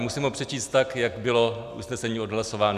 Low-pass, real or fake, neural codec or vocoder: 14.4 kHz; real; none